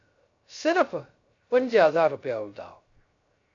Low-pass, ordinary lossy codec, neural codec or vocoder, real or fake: 7.2 kHz; AAC, 48 kbps; codec, 16 kHz, 0.3 kbps, FocalCodec; fake